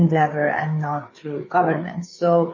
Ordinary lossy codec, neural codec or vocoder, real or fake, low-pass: MP3, 32 kbps; codec, 16 kHz, 4 kbps, FreqCodec, larger model; fake; 7.2 kHz